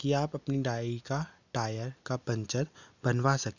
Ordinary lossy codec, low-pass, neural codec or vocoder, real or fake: none; 7.2 kHz; none; real